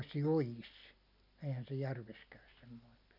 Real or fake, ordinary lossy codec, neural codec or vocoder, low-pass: real; none; none; 5.4 kHz